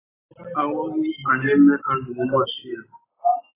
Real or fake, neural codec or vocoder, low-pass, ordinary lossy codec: real; none; 3.6 kHz; AAC, 16 kbps